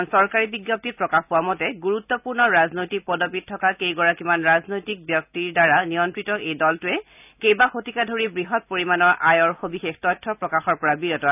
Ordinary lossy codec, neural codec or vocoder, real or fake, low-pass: none; none; real; 3.6 kHz